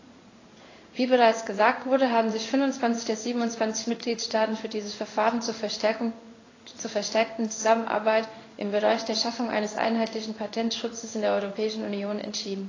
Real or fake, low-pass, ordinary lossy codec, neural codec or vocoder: fake; 7.2 kHz; AAC, 32 kbps; codec, 16 kHz in and 24 kHz out, 1 kbps, XY-Tokenizer